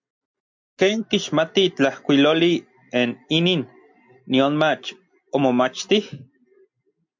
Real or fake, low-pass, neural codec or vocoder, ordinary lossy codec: real; 7.2 kHz; none; MP3, 48 kbps